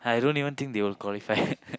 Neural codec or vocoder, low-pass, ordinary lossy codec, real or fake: none; none; none; real